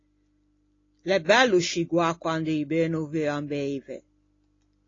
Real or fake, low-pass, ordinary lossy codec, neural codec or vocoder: real; 7.2 kHz; AAC, 32 kbps; none